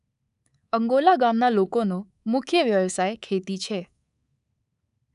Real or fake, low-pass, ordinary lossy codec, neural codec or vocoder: fake; 10.8 kHz; none; codec, 24 kHz, 3.1 kbps, DualCodec